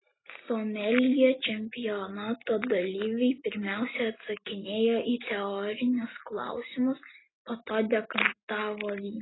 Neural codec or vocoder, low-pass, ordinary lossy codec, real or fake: none; 7.2 kHz; AAC, 16 kbps; real